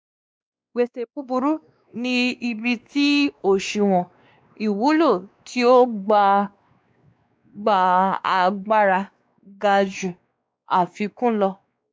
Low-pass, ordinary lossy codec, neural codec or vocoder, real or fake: none; none; codec, 16 kHz, 2 kbps, X-Codec, WavLM features, trained on Multilingual LibriSpeech; fake